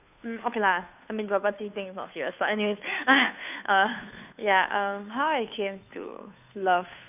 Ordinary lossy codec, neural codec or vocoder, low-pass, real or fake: none; codec, 16 kHz, 2 kbps, FunCodec, trained on Chinese and English, 25 frames a second; 3.6 kHz; fake